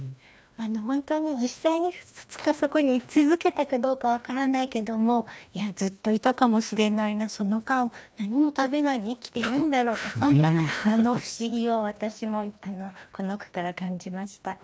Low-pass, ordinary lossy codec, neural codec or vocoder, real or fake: none; none; codec, 16 kHz, 1 kbps, FreqCodec, larger model; fake